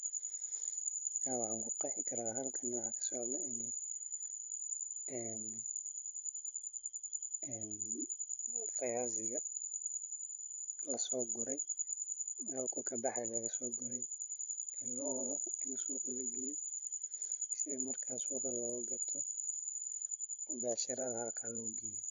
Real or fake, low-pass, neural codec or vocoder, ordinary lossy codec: fake; 7.2 kHz; codec, 16 kHz, 16 kbps, FreqCodec, larger model; none